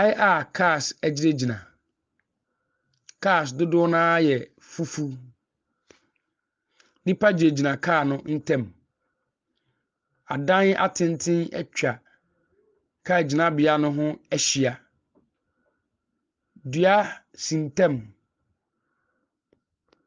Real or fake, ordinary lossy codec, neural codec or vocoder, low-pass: real; Opus, 24 kbps; none; 7.2 kHz